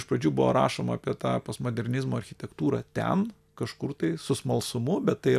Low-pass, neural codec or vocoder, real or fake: 14.4 kHz; none; real